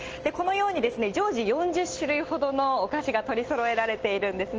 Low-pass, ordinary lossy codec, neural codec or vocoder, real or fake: 7.2 kHz; Opus, 16 kbps; none; real